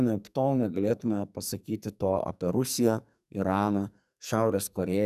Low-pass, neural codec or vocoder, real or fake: 14.4 kHz; codec, 44.1 kHz, 2.6 kbps, SNAC; fake